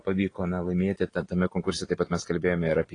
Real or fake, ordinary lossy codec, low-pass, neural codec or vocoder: real; AAC, 32 kbps; 9.9 kHz; none